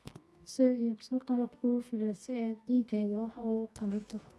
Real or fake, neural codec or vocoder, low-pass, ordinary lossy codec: fake; codec, 24 kHz, 0.9 kbps, WavTokenizer, medium music audio release; none; none